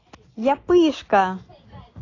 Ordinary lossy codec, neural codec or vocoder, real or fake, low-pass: AAC, 32 kbps; none; real; 7.2 kHz